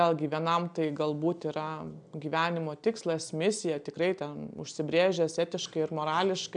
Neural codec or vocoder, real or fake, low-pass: none; real; 9.9 kHz